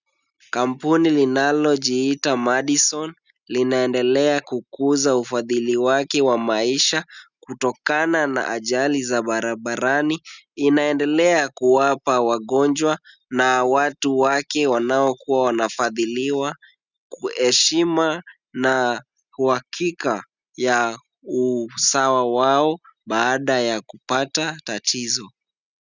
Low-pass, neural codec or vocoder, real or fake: 7.2 kHz; none; real